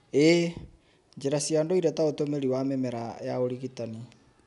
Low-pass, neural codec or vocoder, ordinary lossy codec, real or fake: 10.8 kHz; none; none; real